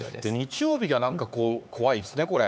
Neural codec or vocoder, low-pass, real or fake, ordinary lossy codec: codec, 16 kHz, 4 kbps, X-Codec, HuBERT features, trained on LibriSpeech; none; fake; none